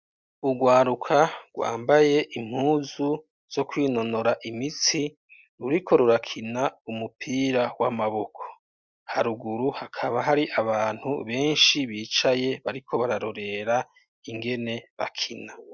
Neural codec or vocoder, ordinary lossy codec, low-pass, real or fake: none; Opus, 64 kbps; 7.2 kHz; real